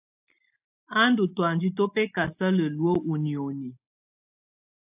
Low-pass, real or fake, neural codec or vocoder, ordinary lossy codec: 3.6 kHz; real; none; AAC, 32 kbps